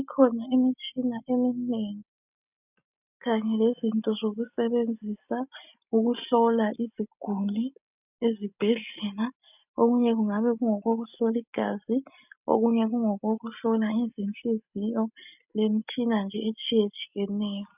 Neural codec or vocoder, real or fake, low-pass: none; real; 3.6 kHz